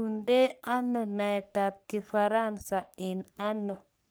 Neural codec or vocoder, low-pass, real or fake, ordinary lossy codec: codec, 44.1 kHz, 3.4 kbps, Pupu-Codec; none; fake; none